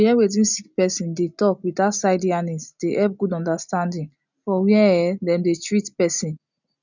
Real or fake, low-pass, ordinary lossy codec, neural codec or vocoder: real; 7.2 kHz; none; none